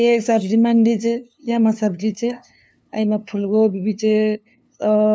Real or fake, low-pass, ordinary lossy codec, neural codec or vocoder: fake; none; none; codec, 16 kHz, 2 kbps, FunCodec, trained on LibriTTS, 25 frames a second